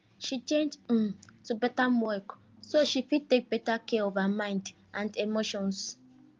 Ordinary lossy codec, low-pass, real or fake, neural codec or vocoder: Opus, 32 kbps; 7.2 kHz; real; none